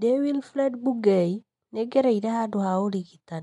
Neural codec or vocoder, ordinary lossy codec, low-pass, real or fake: none; MP3, 64 kbps; 10.8 kHz; real